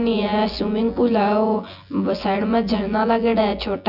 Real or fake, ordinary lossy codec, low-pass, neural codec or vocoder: fake; none; 5.4 kHz; vocoder, 24 kHz, 100 mel bands, Vocos